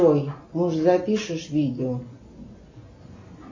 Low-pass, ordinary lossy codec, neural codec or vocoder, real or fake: 7.2 kHz; MP3, 32 kbps; none; real